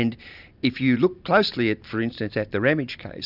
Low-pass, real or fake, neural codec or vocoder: 5.4 kHz; real; none